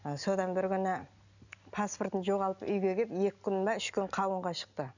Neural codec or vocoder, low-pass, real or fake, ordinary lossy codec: none; 7.2 kHz; real; none